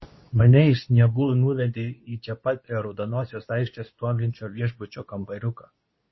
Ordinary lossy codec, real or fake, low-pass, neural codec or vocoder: MP3, 24 kbps; fake; 7.2 kHz; codec, 24 kHz, 0.9 kbps, WavTokenizer, medium speech release version 2